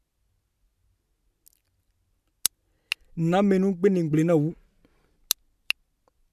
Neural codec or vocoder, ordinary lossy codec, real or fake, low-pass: none; MP3, 96 kbps; real; 14.4 kHz